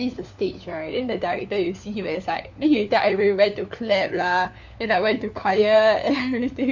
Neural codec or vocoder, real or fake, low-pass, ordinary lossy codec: codec, 16 kHz, 4 kbps, FunCodec, trained on LibriTTS, 50 frames a second; fake; 7.2 kHz; none